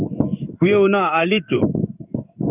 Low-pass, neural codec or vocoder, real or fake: 3.6 kHz; codec, 16 kHz in and 24 kHz out, 1 kbps, XY-Tokenizer; fake